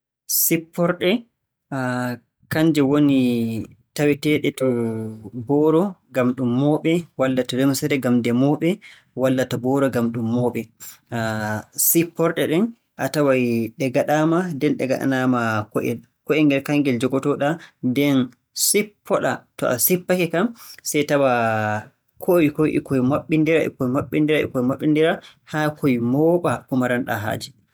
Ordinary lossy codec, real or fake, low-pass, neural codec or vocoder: none; real; none; none